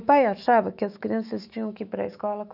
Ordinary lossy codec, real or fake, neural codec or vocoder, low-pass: none; fake; autoencoder, 48 kHz, 128 numbers a frame, DAC-VAE, trained on Japanese speech; 5.4 kHz